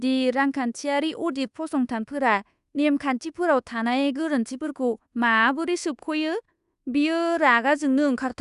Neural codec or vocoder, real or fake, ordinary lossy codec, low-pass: codec, 24 kHz, 1.2 kbps, DualCodec; fake; Opus, 64 kbps; 10.8 kHz